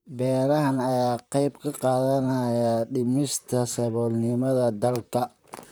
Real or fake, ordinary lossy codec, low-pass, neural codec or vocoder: fake; none; none; vocoder, 44.1 kHz, 128 mel bands, Pupu-Vocoder